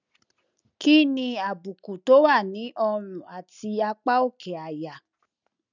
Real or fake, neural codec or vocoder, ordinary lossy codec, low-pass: real; none; none; 7.2 kHz